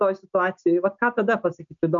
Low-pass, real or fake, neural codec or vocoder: 7.2 kHz; real; none